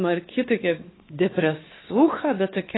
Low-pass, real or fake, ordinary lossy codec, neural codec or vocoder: 7.2 kHz; fake; AAC, 16 kbps; codec, 16 kHz, 4 kbps, X-Codec, WavLM features, trained on Multilingual LibriSpeech